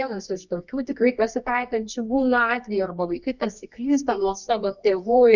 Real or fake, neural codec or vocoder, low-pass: fake; codec, 24 kHz, 0.9 kbps, WavTokenizer, medium music audio release; 7.2 kHz